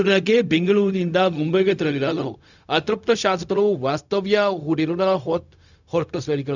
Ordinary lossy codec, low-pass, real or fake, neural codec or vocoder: none; 7.2 kHz; fake; codec, 16 kHz, 0.4 kbps, LongCat-Audio-Codec